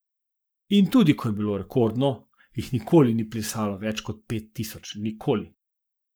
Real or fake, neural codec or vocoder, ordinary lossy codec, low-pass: fake; codec, 44.1 kHz, 7.8 kbps, Pupu-Codec; none; none